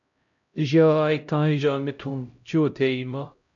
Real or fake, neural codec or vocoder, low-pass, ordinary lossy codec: fake; codec, 16 kHz, 0.5 kbps, X-Codec, HuBERT features, trained on LibriSpeech; 7.2 kHz; MP3, 64 kbps